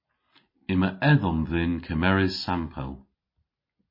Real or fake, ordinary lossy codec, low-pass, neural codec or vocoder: real; MP3, 24 kbps; 5.4 kHz; none